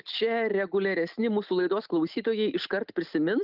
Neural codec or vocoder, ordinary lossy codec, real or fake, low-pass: none; Opus, 24 kbps; real; 5.4 kHz